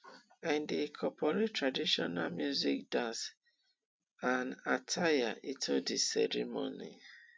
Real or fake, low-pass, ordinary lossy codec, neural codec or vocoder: real; none; none; none